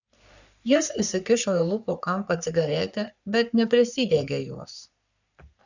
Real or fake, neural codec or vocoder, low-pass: fake; codec, 44.1 kHz, 3.4 kbps, Pupu-Codec; 7.2 kHz